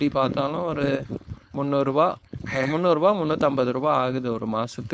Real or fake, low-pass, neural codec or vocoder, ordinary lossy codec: fake; none; codec, 16 kHz, 4.8 kbps, FACodec; none